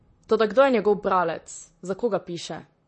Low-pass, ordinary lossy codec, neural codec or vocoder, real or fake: 10.8 kHz; MP3, 32 kbps; vocoder, 44.1 kHz, 128 mel bands, Pupu-Vocoder; fake